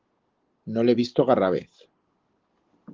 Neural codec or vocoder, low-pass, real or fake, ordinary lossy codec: none; 7.2 kHz; real; Opus, 16 kbps